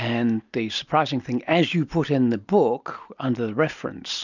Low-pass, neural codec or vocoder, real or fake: 7.2 kHz; none; real